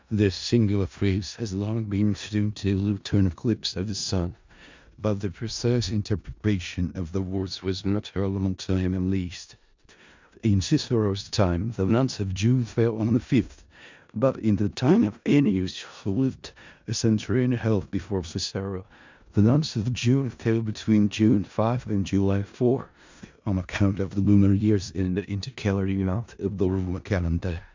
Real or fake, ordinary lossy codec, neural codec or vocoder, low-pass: fake; MP3, 64 kbps; codec, 16 kHz in and 24 kHz out, 0.4 kbps, LongCat-Audio-Codec, four codebook decoder; 7.2 kHz